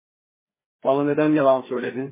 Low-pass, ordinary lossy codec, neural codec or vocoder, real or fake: 3.6 kHz; MP3, 16 kbps; codec, 16 kHz, 2 kbps, FreqCodec, larger model; fake